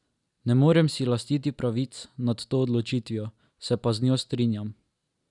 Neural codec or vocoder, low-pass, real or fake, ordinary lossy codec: none; 10.8 kHz; real; none